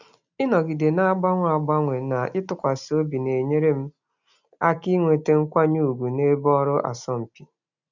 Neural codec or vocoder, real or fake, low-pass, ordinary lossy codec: none; real; 7.2 kHz; none